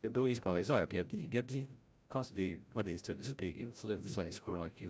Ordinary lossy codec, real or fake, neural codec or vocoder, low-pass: none; fake; codec, 16 kHz, 0.5 kbps, FreqCodec, larger model; none